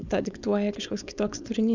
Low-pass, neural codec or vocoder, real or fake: 7.2 kHz; codec, 16 kHz, 4.8 kbps, FACodec; fake